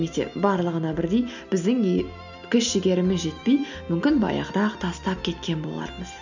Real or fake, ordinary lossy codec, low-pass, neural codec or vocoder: real; none; 7.2 kHz; none